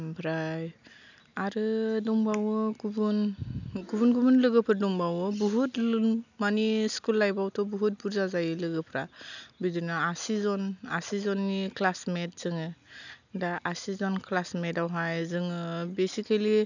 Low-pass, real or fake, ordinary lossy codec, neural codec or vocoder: 7.2 kHz; real; none; none